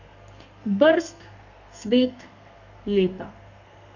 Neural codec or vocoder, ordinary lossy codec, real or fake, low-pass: codec, 32 kHz, 1.9 kbps, SNAC; none; fake; 7.2 kHz